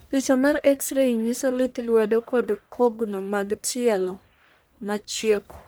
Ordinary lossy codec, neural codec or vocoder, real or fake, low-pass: none; codec, 44.1 kHz, 1.7 kbps, Pupu-Codec; fake; none